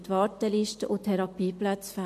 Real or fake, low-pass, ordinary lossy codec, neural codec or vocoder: real; 14.4 kHz; MP3, 64 kbps; none